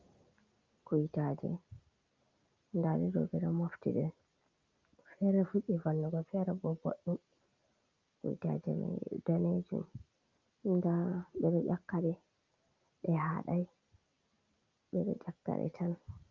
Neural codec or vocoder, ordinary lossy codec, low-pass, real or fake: none; Opus, 24 kbps; 7.2 kHz; real